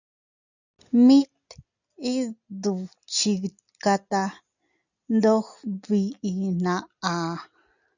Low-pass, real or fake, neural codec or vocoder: 7.2 kHz; real; none